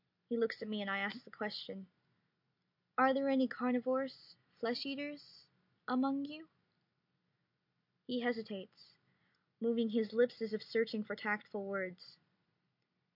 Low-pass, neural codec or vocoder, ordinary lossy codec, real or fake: 5.4 kHz; none; MP3, 48 kbps; real